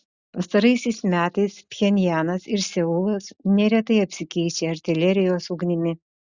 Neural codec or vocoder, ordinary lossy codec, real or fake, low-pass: none; Opus, 64 kbps; real; 7.2 kHz